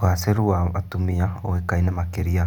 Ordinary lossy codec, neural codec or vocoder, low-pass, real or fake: none; none; 19.8 kHz; real